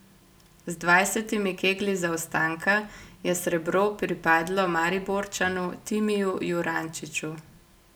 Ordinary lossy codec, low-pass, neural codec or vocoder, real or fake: none; none; none; real